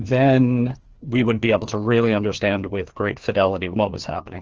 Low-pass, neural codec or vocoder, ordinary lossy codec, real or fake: 7.2 kHz; codec, 16 kHz, 2 kbps, FreqCodec, larger model; Opus, 16 kbps; fake